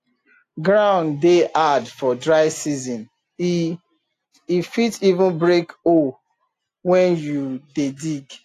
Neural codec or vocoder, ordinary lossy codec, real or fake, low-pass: none; AAC, 64 kbps; real; 14.4 kHz